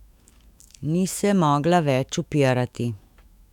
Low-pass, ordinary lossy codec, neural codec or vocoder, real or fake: 19.8 kHz; none; autoencoder, 48 kHz, 128 numbers a frame, DAC-VAE, trained on Japanese speech; fake